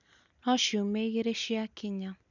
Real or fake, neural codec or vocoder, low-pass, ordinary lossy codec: real; none; 7.2 kHz; none